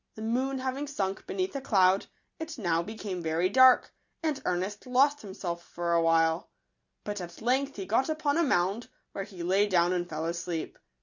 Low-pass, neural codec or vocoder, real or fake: 7.2 kHz; none; real